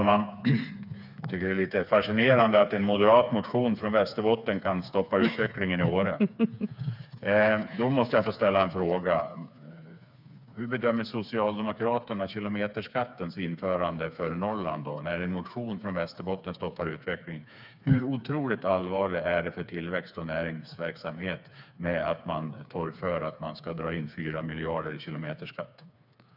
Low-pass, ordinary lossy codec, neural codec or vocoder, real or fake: 5.4 kHz; none; codec, 16 kHz, 4 kbps, FreqCodec, smaller model; fake